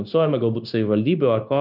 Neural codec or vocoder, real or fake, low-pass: codec, 16 kHz, 0.9 kbps, LongCat-Audio-Codec; fake; 5.4 kHz